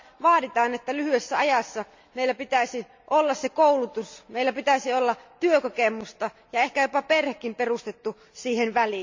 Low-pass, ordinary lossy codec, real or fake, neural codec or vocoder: 7.2 kHz; MP3, 64 kbps; real; none